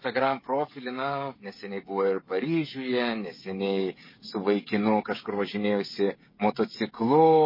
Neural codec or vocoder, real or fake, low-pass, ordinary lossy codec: codec, 16 kHz, 16 kbps, FreqCodec, smaller model; fake; 5.4 kHz; MP3, 24 kbps